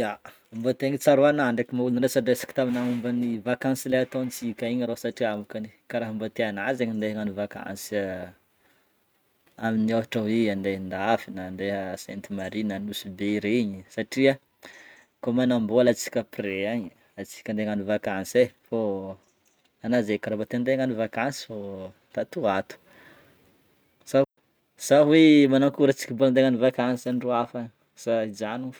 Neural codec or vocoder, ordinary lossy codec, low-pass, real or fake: none; none; none; real